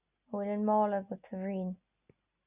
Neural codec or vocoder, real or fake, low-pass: none; real; 3.6 kHz